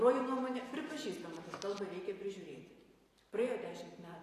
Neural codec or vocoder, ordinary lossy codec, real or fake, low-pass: vocoder, 44.1 kHz, 128 mel bands every 512 samples, BigVGAN v2; AAC, 48 kbps; fake; 14.4 kHz